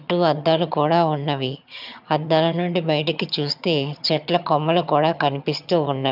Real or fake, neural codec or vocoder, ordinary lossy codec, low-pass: fake; vocoder, 22.05 kHz, 80 mel bands, HiFi-GAN; none; 5.4 kHz